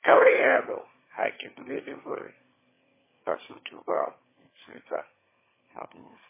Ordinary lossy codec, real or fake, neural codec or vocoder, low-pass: MP3, 16 kbps; fake; autoencoder, 22.05 kHz, a latent of 192 numbers a frame, VITS, trained on one speaker; 3.6 kHz